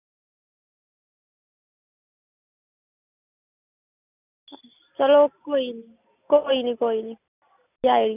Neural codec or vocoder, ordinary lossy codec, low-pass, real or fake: none; none; 3.6 kHz; real